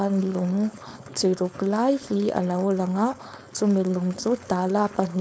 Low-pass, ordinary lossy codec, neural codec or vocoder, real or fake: none; none; codec, 16 kHz, 4.8 kbps, FACodec; fake